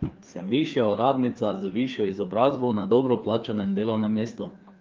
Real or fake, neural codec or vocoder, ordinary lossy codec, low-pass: fake; codec, 16 kHz, 2 kbps, FreqCodec, larger model; Opus, 24 kbps; 7.2 kHz